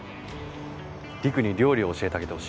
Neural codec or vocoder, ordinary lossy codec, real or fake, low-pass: none; none; real; none